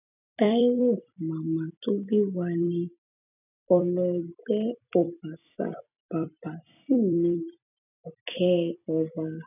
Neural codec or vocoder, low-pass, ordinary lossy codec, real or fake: vocoder, 44.1 kHz, 128 mel bands every 256 samples, BigVGAN v2; 3.6 kHz; none; fake